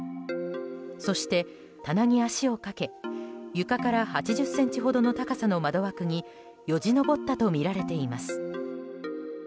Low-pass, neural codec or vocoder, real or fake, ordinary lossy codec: none; none; real; none